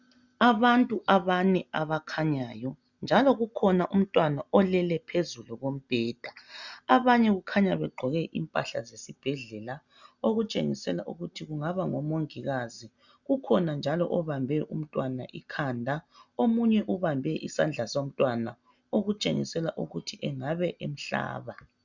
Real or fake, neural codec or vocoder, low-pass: real; none; 7.2 kHz